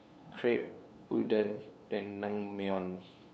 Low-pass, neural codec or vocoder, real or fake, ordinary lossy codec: none; codec, 16 kHz, 2 kbps, FunCodec, trained on LibriTTS, 25 frames a second; fake; none